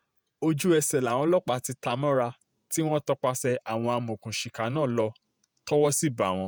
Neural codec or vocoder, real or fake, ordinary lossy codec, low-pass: vocoder, 48 kHz, 128 mel bands, Vocos; fake; none; none